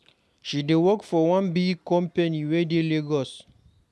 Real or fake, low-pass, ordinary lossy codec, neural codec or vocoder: real; none; none; none